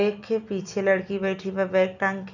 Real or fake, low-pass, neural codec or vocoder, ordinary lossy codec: real; 7.2 kHz; none; none